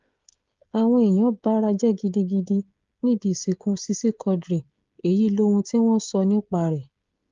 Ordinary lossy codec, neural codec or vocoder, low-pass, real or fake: Opus, 32 kbps; codec, 16 kHz, 16 kbps, FreqCodec, smaller model; 7.2 kHz; fake